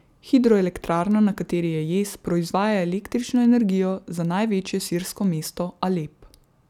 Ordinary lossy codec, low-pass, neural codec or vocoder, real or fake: none; 19.8 kHz; none; real